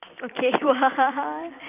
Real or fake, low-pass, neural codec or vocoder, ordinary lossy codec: fake; 3.6 kHz; vocoder, 44.1 kHz, 128 mel bands every 256 samples, BigVGAN v2; none